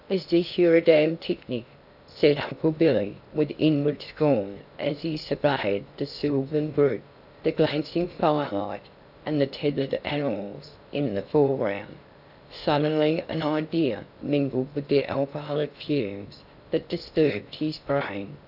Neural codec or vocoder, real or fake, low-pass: codec, 16 kHz in and 24 kHz out, 0.6 kbps, FocalCodec, streaming, 2048 codes; fake; 5.4 kHz